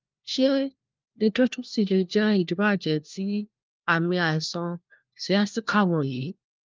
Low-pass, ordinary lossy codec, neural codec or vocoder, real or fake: 7.2 kHz; Opus, 24 kbps; codec, 16 kHz, 1 kbps, FunCodec, trained on LibriTTS, 50 frames a second; fake